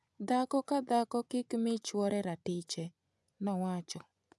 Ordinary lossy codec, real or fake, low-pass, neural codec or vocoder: none; fake; none; vocoder, 24 kHz, 100 mel bands, Vocos